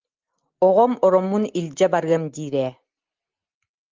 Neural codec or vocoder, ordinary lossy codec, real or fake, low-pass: none; Opus, 32 kbps; real; 7.2 kHz